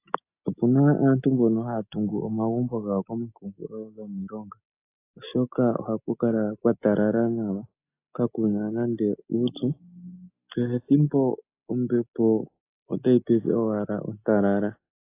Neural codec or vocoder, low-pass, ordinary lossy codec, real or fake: none; 3.6 kHz; AAC, 24 kbps; real